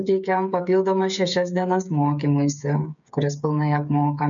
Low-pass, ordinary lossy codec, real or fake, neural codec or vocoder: 7.2 kHz; MP3, 96 kbps; fake; codec, 16 kHz, 8 kbps, FreqCodec, smaller model